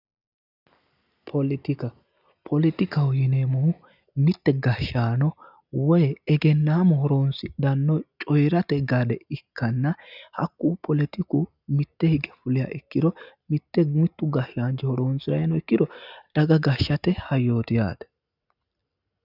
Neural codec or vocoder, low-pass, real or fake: none; 5.4 kHz; real